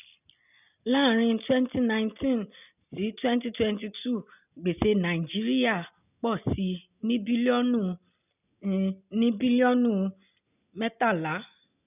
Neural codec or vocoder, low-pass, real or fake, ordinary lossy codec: none; 3.6 kHz; real; none